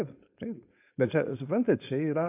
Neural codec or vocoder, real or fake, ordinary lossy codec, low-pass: codec, 16 kHz, 4.8 kbps, FACodec; fake; AAC, 24 kbps; 3.6 kHz